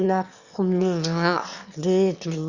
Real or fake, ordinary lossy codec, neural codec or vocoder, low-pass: fake; Opus, 64 kbps; autoencoder, 22.05 kHz, a latent of 192 numbers a frame, VITS, trained on one speaker; 7.2 kHz